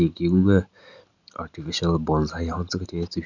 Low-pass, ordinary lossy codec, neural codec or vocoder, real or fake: 7.2 kHz; none; none; real